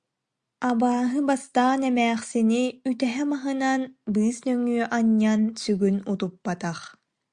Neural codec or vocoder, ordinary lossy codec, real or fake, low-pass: none; Opus, 64 kbps; real; 9.9 kHz